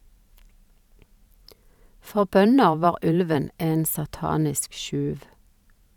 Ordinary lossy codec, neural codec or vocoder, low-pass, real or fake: none; vocoder, 44.1 kHz, 128 mel bands every 512 samples, BigVGAN v2; 19.8 kHz; fake